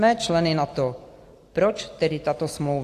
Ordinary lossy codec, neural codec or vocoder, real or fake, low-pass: AAC, 64 kbps; none; real; 14.4 kHz